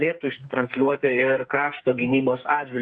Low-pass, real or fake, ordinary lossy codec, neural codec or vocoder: 9.9 kHz; fake; Opus, 64 kbps; codec, 44.1 kHz, 2.6 kbps, SNAC